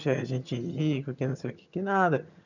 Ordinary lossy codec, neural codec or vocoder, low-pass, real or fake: none; vocoder, 22.05 kHz, 80 mel bands, HiFi-GAN; 7.2 kHz; fake